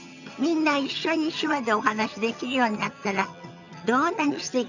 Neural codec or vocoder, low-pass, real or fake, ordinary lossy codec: vocoder, 22.05 kHz, 80 mel bands, HiFi-GAN; 7.2 kHz; fake; none